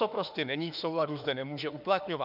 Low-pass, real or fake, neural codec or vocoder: 5.4 kHz; fake; autoencoder, 48 kHz, 32 numbers a frame, DAC-VAE, trained on Japanese speech